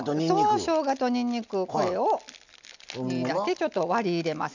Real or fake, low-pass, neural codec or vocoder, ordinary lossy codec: real; 7.2 kHz; none; none